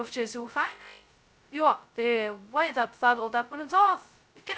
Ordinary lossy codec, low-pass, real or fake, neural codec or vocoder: none; none; fake; codec, 16 kHz, 0.2 kbps, FocalCodec